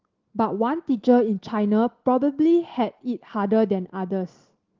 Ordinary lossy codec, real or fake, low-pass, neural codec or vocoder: Opus, 16 kbps; real; 7.2 kHz; none